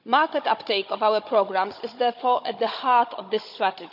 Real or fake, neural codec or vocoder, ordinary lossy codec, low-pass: fake; codec, 16 kHz, 16 kbps, FunCodec, trained on Chinese and English, 50 frames a second; none; 5.4 kHz